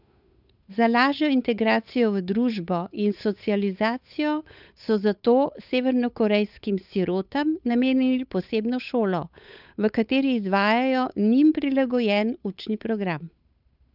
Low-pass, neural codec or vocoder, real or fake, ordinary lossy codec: 5.4 kHz; codec, 16 kHz, 8 kbps, FunCodec, trained on Chinese and English, 25 frames a second; fake; none